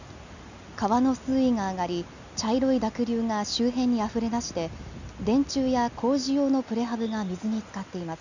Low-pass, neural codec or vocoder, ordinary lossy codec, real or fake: 7.2 kHz; none; none; real